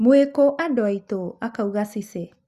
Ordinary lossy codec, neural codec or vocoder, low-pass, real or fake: Opus, 64 kbps; none; 14.4 kHz; real